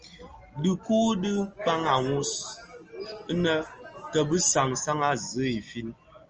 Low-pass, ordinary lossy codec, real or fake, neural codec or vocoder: 7.2 kHz; Opus, 24 kbps; real; none